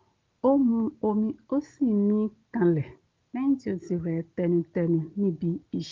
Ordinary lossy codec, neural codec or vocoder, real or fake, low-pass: Opus, 24 kbps; none; real; 7.2 kHz